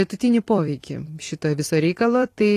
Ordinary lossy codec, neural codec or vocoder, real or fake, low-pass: AAC, 48 kbps; vocoder, 44.1 kHz, 128 mel bands every 256 samples, BigVGAN v2; fake; 14.4 kHz